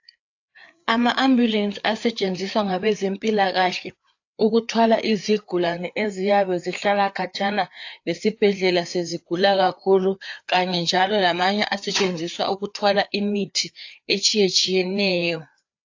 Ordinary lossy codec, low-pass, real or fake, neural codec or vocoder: AAC, 48 kbps; 7.2 kHz; fake; codec, 16 kHz, 4 kbps, FreqCodec, larger model